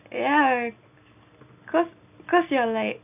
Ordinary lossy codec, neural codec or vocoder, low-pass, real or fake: none; none; 3.6 kHz; real